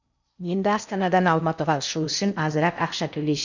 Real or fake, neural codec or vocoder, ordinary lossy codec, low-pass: fake; codec, 16 kHz in and 24 kHz out, 0.6 kbps, FocalCodec, streaming, 4096 codes; none; 7.2 kHz